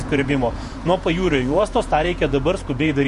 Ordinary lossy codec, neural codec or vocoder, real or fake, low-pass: MP3, 48 kbps; vocoder, 48 kHz, 128 mel bands, Vocos; fake; 14.4 kHz